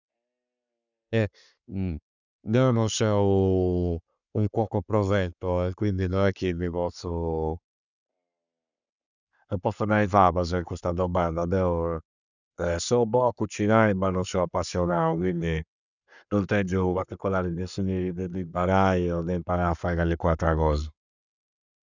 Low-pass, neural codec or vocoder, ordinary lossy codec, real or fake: 7.2 kHz; codec, 44.1 kHz, 7.8 kbps, Pupu-Codec; none; fake